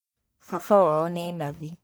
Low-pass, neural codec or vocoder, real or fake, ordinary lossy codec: none; codec, 44.1 kHz, 1.7 kbps, Pupu-Codec; fake; none